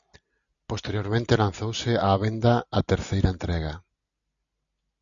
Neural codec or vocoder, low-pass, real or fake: none; 7.2 kHz; real